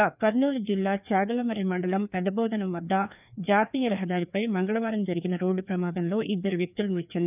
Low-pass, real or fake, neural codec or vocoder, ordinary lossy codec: 3.6 kHz; fake; codec, 16 kHz, 2 kbps, FreqCodec, larger model; none